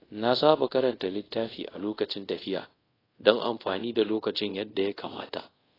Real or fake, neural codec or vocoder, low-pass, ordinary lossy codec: fake; codec, 24 kHz, 0.5 kbps, DualCodec; 5.4 kHz; AAC, 24 kbps